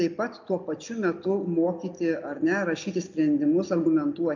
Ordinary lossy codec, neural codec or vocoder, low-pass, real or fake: MP3, 64 kbps; none; 7.2 kHz; real